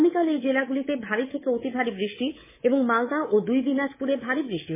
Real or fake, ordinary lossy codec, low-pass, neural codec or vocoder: fake; MP3, 16 kbps; 3.6 kHz; codec, 24 kHz, 3.1 kbps, DualCodec